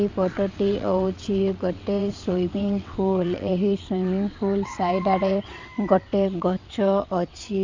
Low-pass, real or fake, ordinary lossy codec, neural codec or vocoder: 7.2 kHz; fake; MP3, 64 kbps; vocoder, 22.05 kHz, 80 mel bands, WaveNeXt